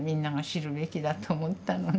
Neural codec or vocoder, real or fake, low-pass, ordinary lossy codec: none; real; none; none